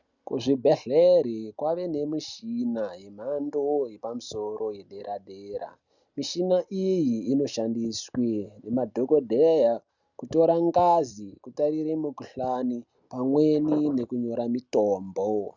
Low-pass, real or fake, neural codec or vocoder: 7.2 kHz; real; none